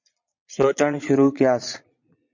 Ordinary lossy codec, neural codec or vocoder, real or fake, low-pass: MP3, 48 kbps; vocoder, 22.05 kHz, 80 mel bands, Vocos; fake; 7.2 kHz